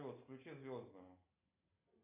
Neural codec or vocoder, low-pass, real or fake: none; 3.6 kHz; real